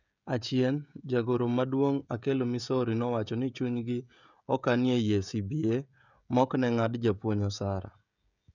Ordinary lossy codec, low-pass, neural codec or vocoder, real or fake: none; 7.2 kHz; codec, 16 kHz, 16 kbps, FreqCodec, smaller model; fake